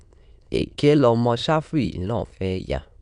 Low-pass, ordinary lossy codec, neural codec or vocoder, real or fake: 9.9 kHz; none; autoencoder, 22.05 kHz, a latent of 192 numbers a frame, VITS, trained on many speakers; fake